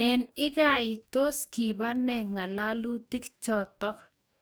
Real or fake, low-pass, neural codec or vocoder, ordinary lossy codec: fake; none; codec, 44.1 kHz, 2.6 kbps, DAC; none